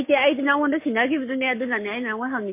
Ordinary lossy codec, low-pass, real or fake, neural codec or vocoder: MP3, 24 kbps; 3.6 kHz; fake; codec, 44.1 kHz, 7.8 kbps, Pupu-Codec